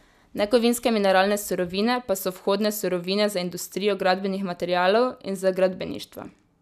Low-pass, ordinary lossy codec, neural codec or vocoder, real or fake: 14.4 kHz; none; none; real